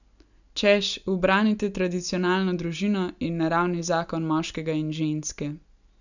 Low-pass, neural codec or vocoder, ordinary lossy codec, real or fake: 7.2 kHz; none; none; real